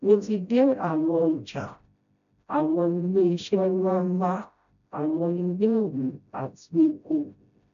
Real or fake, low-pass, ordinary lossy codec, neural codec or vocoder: fake; 7.2 kHz; none; codec, 16 kHz, 0.5 kbps, FreqCodec, smaller model